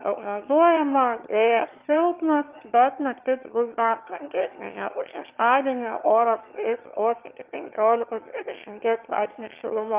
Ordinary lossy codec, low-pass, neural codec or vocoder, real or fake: Opus, 24 kbps; 3.6 kHz; autoencoder, 22.05 kHz, a latent of 192 numbers a frame, VITS, trained on one speaker; fake